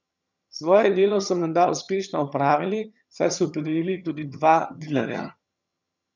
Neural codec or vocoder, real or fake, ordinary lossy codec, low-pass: vocoder, 22.05 kHz, 80 mel bands, HiFi-GAN; fake; none; 7.2 kHz